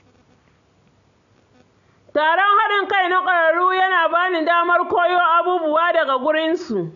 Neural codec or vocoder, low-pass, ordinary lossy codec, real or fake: none; 7.2 kHz; none; real